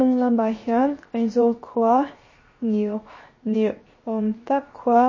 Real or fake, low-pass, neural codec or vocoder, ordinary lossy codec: fake; 7.2 kHz; codec, 16 kHz, 0.7 kbps, FocalCodec; MP3, 32 kbps